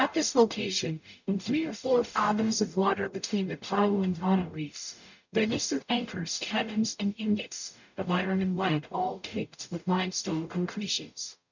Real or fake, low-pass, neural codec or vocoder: fake; 7.2 kHz; codec, 44.1 kHz, 0.9 kbps, DAC